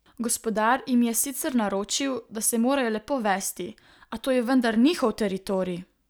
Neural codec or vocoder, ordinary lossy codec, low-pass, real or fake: none; none; none; real